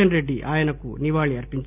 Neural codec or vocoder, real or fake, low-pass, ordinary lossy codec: none; real; 3.6 kHz; none